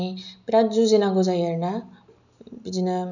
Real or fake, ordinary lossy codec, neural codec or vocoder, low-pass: real; none; none; 7.2 kHz